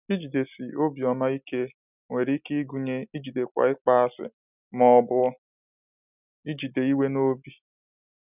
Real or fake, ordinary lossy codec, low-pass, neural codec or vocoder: real; none; 3.6 kHz; none